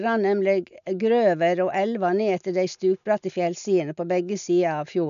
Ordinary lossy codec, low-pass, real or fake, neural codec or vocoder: none; 7.2 kHz; real; none